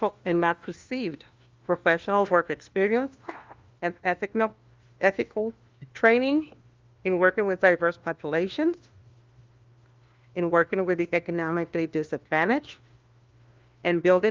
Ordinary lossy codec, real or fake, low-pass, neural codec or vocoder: Opus, 32 kbps; fake; 7.2 kHz; codec, 16 kHz, 1 kbps, FunCodec, trained on LibriTTS, 50 frames a second